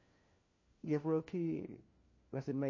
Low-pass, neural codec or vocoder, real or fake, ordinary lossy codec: 7.2 kHz; codec, 16 kHz, 1 kbps, FunCodec, trained on LibriTTS, 50 frames a second; fake; MP3, 32 kbps